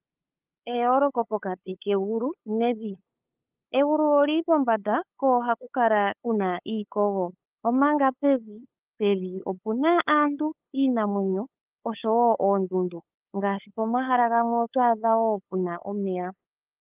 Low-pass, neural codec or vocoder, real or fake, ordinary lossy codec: 3.6 kHz; codec, 16 kHz, 8 kbps, FunCodec, trained on LibriTTS, 25 frames a second; fake; Opus, 24 kbps